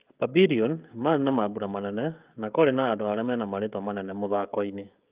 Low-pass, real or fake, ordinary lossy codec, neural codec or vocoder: 3.6 kHz; fake; Opus, 24 kbps; codec, 16 kHz, 16 kbps, FreqCodec, smaller model